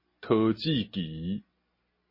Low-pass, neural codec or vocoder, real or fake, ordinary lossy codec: 5.4 kHz; none; real; MP3, 24 kbps